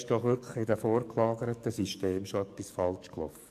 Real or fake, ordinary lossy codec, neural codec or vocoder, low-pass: fake; AAC, 96 kbps; codec, 44.1 kHz, 7.8 kbps, DAC; 14.4 kHz